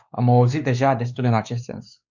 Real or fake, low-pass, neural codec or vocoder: fake; 7.2 kHz; codec, 16 kHz, 2 kbps, X-Codec, WavLM features, trained on Multilingual LibriSpeech